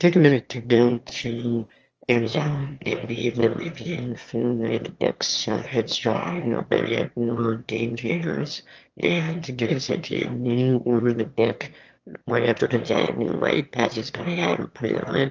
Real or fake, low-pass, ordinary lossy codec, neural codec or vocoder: fake; 7.2 kHz; Opus, 24 kbps; autoencoder, 22.05 kHz, a latent of 192 numbers a frame, VITS, trained on one speaker